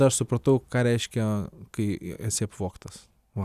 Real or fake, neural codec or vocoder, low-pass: real; none; 14.4 kHz